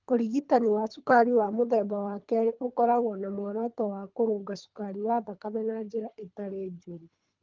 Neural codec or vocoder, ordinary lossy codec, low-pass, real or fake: codec, 24 kHz, 3 kbps, HILCodec; Opus, 24 kbps; 7.2 kHz; fake